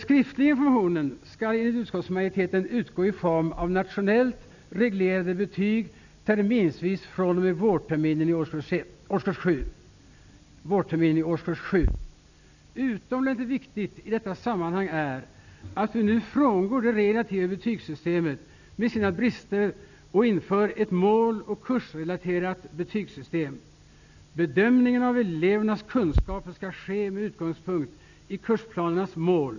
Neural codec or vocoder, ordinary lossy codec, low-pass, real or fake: none; none; 7.2 kHz; real